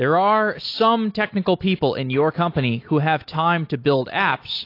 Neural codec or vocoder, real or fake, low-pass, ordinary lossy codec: none; real; 5.4 kHz; AAC, 32 kbps